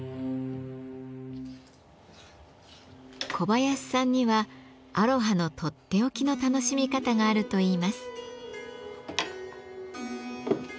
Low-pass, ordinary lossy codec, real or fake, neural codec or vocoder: none; none; real; none